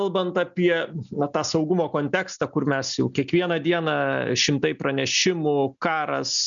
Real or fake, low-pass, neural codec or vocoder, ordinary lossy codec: real; 7.2 kHz; none; MP3, 96 kbps